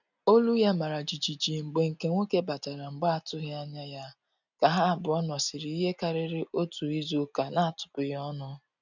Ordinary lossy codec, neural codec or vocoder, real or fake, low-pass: none; none; real; 7.2 kHz